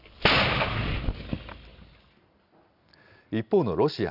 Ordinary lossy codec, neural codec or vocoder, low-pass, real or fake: none; none; 5.4 kHz; real